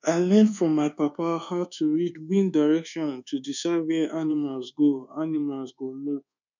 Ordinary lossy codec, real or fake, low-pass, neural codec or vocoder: none; fake; 7.2 kHz; codec, 24 kHz, 1.2 kbps, DualCodec